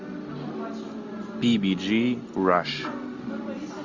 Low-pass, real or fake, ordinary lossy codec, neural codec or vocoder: 7.2 kHz; real; MP3, 64 kbps; none